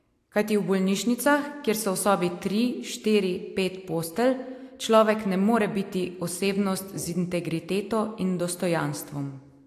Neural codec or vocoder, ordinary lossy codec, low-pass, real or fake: none; AAC, 64 kbps; 14.4 kHz; real